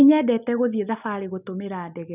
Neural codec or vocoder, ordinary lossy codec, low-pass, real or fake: none; none; 3.6 kHz; real